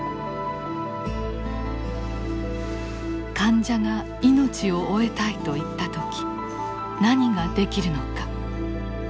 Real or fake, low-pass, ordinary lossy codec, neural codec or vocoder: real; none; none; none